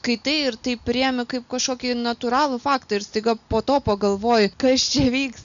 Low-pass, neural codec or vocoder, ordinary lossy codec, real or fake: 7.2 kHz; none; MP3, 96 kbps; real